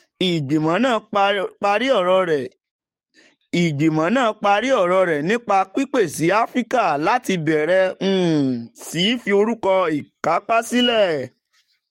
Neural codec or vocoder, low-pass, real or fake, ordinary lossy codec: codec, 44.1 kHz, 7.8 kbps, DAC; 19.8 kHz; fake; MP3, 64 kbps